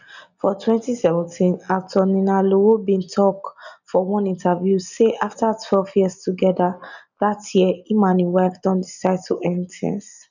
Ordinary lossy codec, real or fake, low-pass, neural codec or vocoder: none; real; 7.2 kHz; none